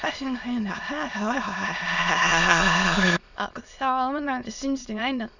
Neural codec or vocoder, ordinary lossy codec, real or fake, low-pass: autoencoder, 22.05 kHz, a latent of 192 numbers a frame, VITS, trained on many speakers; none; fake; 7.2 kHz